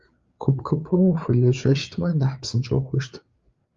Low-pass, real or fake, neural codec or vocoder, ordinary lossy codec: 7.2 kHz; fake; codec, 16 kHz, 4 kbps, FreqCodec, larger model; Opus, 32 kbps